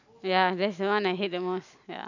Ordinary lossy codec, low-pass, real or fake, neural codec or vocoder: none; 7.2 kHz; real; none